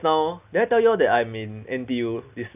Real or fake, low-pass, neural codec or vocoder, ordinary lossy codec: real; 3.6 kHz; none; none